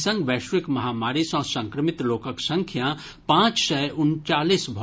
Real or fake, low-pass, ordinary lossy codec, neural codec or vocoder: real; none; none; none